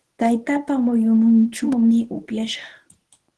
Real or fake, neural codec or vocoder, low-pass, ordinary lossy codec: fake; codec, 24 kHz, 0.9 kbps, WavTokenizer, medium speech release version 1; 10.8 kHz; Opus, 16 kbps